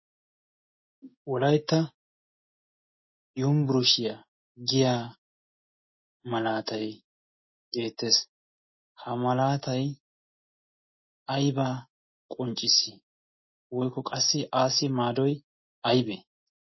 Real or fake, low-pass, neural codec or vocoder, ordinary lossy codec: real; 7.2 kHz; none; MP3, 24 kbps